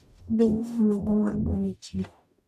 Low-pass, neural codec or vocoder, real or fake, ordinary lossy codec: 14.4 kHz; codec, 44.1 kHz, 0.9 kbps, DAC; fake; AAC, 96 kbps